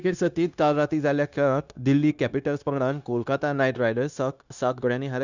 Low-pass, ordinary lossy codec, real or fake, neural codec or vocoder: 7.2 kHz; none; fake; codec, 16 kHz, 0.9 kbps, LongCat-Audio-Codec